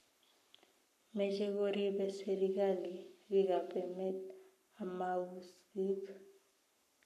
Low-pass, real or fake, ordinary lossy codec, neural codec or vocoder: 14.4 kHz; fake; none; codec, 44.1 kHz, 7.8 kbps, Pupu-Codec